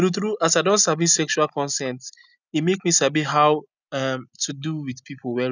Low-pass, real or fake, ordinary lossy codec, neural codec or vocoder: 7.2 kHz; real; none; none